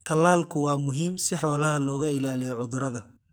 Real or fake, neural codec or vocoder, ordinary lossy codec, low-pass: fake; codec, 44.1 kHz, 2.6 kbps, SNAC; none; none